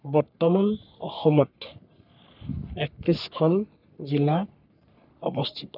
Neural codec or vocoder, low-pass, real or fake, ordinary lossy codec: codec, 44.1 kHz, 3.4 kbps, Pupu-Codec; 5.4 kHz; fake; none